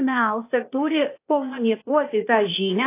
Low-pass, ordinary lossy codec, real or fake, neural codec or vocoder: 3.6 kHz; AAC, 24 kbps; fake; codec, 16 kHz, 0.8 kbps, ZipCodec